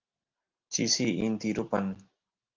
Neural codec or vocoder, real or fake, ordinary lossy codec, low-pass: none; real; Opus, 24 kbps; 7.2 kHz